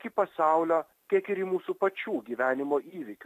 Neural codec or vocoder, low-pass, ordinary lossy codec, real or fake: none; 14.4 kHz; AAC, 64 kbps; real